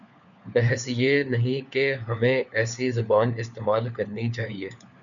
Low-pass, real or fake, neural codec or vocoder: 7.2 kHz; fake; codec, 16 kHz, 4 kbps, FunCodec, trained on LibriTTS, 50 frames a second